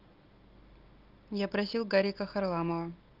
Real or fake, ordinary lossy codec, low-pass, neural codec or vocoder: real; Opus, 32 kbps; 5.4 kHz; none